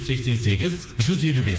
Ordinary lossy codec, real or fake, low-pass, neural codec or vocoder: none; fake; none; codec, 16 kHz, 2 kbps, FreqCodec, smaller model